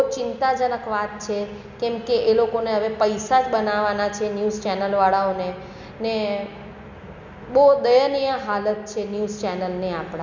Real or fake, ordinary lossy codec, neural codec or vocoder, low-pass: real; none; none; 7.2 kHz